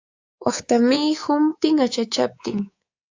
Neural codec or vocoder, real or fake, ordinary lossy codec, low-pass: codec, 24 kHz, 3.1 kbps, DualCodec; fake; AAC, 48 kbps; 7.2 kHz